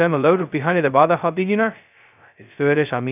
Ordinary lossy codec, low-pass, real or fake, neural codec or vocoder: none; 3.6 kHz; fake; codec, 16 kHz, 0.2 kbps, FocalCodec